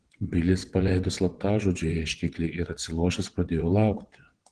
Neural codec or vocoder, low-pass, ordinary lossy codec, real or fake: vocoder, 22.05 kHz, 80 mel bands, WaveNeXt; 9.9 kHz; Opus, 16 kbps; fake